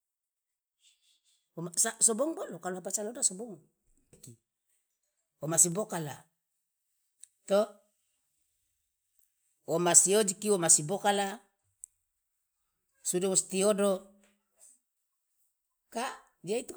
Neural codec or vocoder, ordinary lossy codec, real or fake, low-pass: none; none; real; none